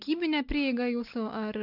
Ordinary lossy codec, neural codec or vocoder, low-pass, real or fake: AAC, 48 kbps; none; 5.4 kHz; real